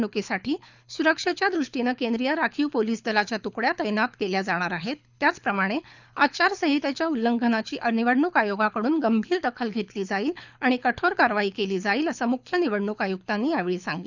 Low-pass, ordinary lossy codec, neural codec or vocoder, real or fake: 7.2 kHz; none; codec, 24 kHz, 6 kbps, HILCodec; fake